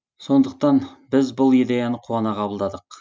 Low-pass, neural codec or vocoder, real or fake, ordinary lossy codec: none; none; real; none